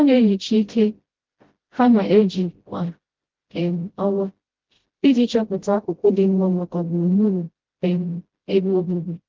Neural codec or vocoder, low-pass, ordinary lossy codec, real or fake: codec, 16 kHz, 0.5 kbps, FreqCodec, smaller model; 7.2 kHz; Opus, 16 kbps; fake